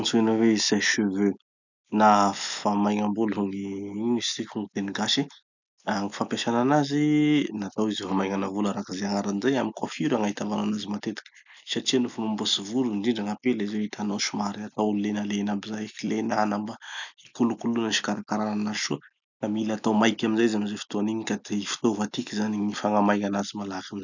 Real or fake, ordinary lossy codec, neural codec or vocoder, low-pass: real; none; none; 7.2 kHz